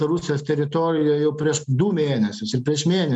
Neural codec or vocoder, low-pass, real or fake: none; 10.8 kHz; real